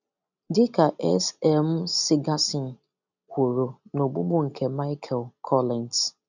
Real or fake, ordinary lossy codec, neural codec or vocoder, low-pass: real; none; none; 7.2 kHz